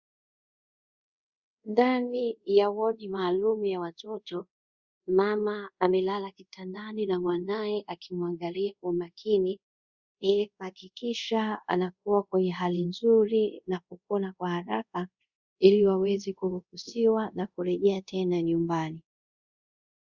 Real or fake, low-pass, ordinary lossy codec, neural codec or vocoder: fake; 7.2 kHz; Opus, 64 kbps; codec, 24 kHz, 0.5 kbps, DualCodec